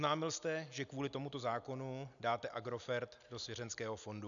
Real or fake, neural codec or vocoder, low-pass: real; none; 7.2 kHz